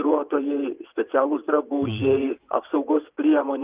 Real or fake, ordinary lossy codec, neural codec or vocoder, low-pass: fake; Opus, 32 kbps; vocoder, 22.05 kHz, 80 mel bands, WaveNeXt; 3.6 kHz